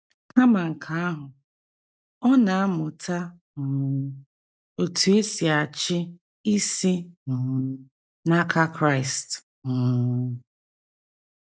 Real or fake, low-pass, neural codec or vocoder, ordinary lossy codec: real; none; none; none